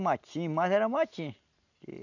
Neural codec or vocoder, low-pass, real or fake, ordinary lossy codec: none; 7.2 kHz; real; none